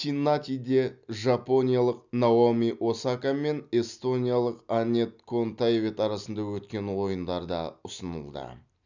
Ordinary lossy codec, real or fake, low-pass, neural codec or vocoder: none; real; 7.2 kHz; none